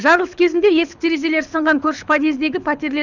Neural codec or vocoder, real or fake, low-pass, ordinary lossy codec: codec, 24 kHz, 6 kbps, HILCodec; fake; 7.2 kHz; none